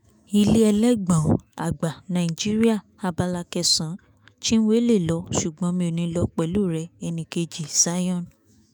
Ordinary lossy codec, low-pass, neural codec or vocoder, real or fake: none; none; autoencoder, 48 kHz, 128 numbers a frame, DAC-VAE, trained on Japanese speech; fake